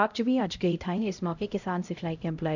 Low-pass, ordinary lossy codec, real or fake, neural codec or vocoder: 7.2 kHz; none; fake; codec, 16 kHz, 0.5 kbps, X-Codec, HuBERT features, trained on LibriSpeech